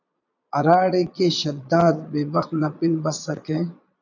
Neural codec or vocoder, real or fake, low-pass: vocoder, 22.05 kHz, 80 mel bands, Vocos; fake; 7.2 kHz